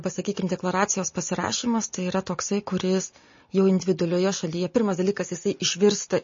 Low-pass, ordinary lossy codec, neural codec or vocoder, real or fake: 7.2 kHz; MP3, 32 kbps; none; real